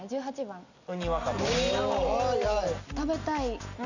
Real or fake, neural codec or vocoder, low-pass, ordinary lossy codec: real; none; 7.2 kHz; none